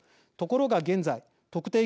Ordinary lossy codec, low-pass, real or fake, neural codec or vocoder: none; none; real; none